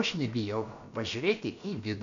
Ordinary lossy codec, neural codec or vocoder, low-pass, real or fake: Opus, 64 kbps; codec, 16 kHz, about 1 kbps, DyCAST, with the encoder's durations; 7.2 kHz; fake